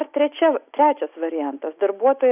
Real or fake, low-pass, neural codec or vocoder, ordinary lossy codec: real; 3.6 kHz; none; AAC, 32 kbps